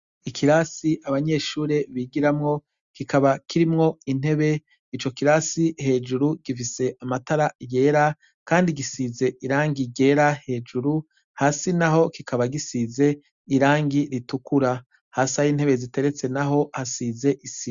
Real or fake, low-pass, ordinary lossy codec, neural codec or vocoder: real; 7.2 kHz; Opus, 64 kbps; none